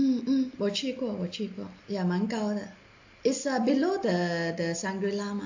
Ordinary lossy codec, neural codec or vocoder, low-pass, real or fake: AAC, 48 kbps; none; 7.2 kHz; real